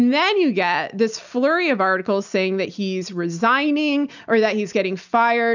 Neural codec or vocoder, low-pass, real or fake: none; 7.2 kHz; real